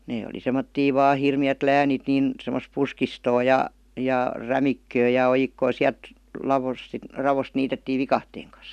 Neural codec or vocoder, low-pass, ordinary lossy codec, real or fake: none; 14.4 kHz; none; real